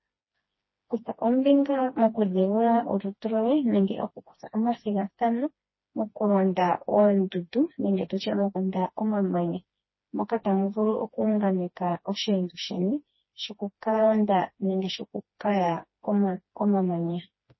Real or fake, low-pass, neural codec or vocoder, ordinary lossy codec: fake; 7.2 kHz; codec, 16 kHz, 2 kbps, FreqCodec, smaller model; MP3, 24 kbps